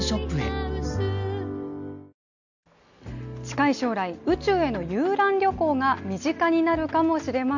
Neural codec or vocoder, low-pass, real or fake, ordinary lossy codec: none; 7.2 kHz; real; none